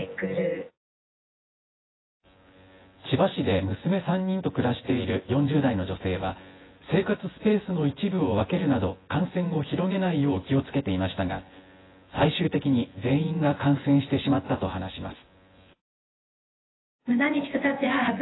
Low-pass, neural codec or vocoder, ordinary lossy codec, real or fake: 7.2 kHz; vocoder, 24 kHz, 100 mel bands, Vocos; AAC, 16 kbps; fake